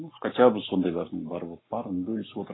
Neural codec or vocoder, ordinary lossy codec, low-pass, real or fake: codec, 44.1 kHz, 7.8 kbps, Pupu-Codec; AAC, 16 kbps; 7.2 kHz; fake